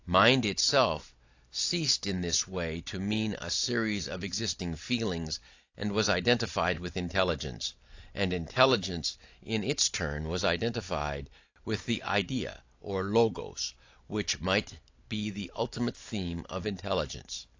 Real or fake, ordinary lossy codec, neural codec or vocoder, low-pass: real; AAC, 48 kbps; none; 7.2 kHz